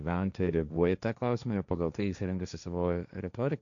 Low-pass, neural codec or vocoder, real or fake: 7.2 kHz; codec, 16 kHz, 1.1 kbps, Voila-Tokenizer; fake